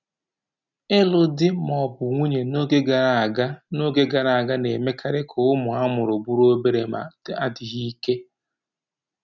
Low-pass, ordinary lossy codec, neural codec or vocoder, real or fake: 7.2 kHz; none; none; real